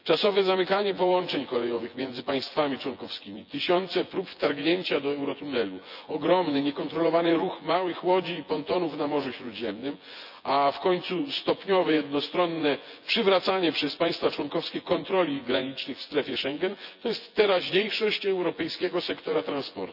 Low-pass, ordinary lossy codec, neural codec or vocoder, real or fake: 5.4 kHz; none; vocoder, 24 kHz, 100 mel bands, Vocos; fake